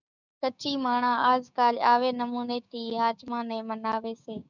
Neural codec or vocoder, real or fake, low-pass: codec, 16 kHz, 6 kbps, DAC; fake; 7.2 kHz